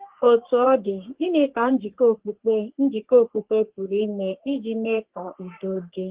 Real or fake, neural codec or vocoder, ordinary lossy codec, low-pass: fake; codec, 44.1 kHz, 3.4 kbps, Pupu-Codec; Opus, 16 kbps; 3.6 kHz